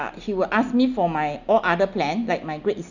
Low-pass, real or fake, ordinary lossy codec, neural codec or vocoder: 7.2 kHz; real; none; none